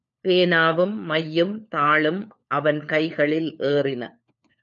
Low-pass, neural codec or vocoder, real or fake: 7.2 kHz; codec, 16 kHz, 4 kbps, FunCodec, trained on LibriTTS, 50 frames a second; fake